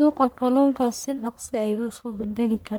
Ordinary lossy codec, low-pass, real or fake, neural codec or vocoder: none; none; fake; codec, 44.1 kHz, 1.7 kbps, Pupu-Codec